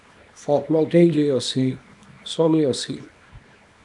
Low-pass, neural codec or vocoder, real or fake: 10.8 kHz; codec, 24 kHz, 0.9 kbps, WavTokenizer, small release; fake